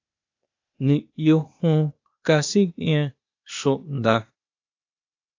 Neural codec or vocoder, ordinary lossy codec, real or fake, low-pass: codec, 16 kHz, 0.8 kbps, ZipCodec; AAC, 48 kbps; fake; 7.2 kHz